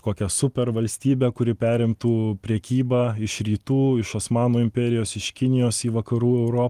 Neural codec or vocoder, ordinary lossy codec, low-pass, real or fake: none; Opus, 24 kbps; 14.4 kHz; real